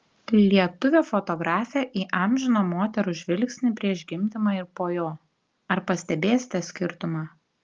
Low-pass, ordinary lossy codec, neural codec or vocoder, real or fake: 7.2 kHz; Opus, 32 kbps; none; real